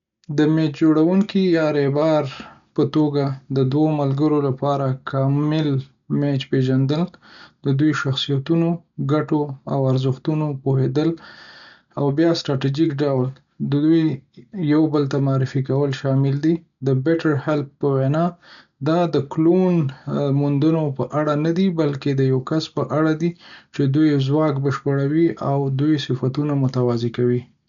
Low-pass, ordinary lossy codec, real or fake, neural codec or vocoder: 7.2 kHz; none; real; none